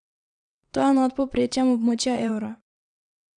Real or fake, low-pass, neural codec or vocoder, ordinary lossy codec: fake; 9.9 kHz; vocoder, 22.05 kHz, 80 mel bands, WaveNeXt; none